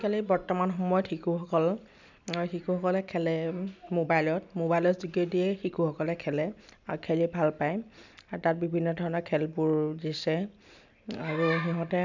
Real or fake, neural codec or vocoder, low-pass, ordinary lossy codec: real; none; 7.2 kHz; none